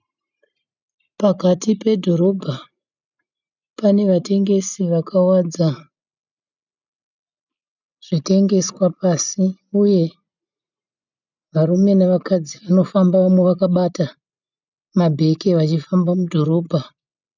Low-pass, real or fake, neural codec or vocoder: 7.2 kHz; real; none